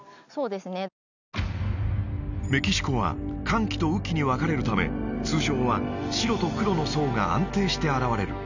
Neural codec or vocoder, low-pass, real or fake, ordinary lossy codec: none; 7.2 kHz; real; none